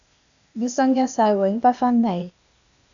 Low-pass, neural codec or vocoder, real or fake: 7.2 kHz; codec, 16 kHz, 0.8 kbps, ZipCodec; fake